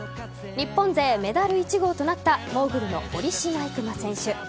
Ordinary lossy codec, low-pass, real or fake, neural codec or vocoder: none; none; real; none